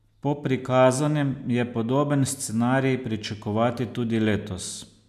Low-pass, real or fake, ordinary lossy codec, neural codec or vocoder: 14.4 kHz; real; none; none